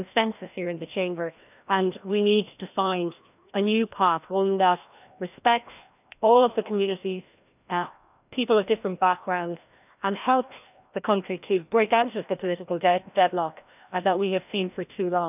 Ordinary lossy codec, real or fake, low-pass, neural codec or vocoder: none; fake; 3.6 kHz; codec, 16 kHz, 1 kbps, FreqCodec, larger model